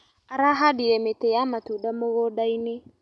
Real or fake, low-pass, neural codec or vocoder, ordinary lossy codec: real; none; none; none